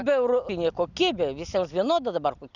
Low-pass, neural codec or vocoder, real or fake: 7.2 kHz; none; real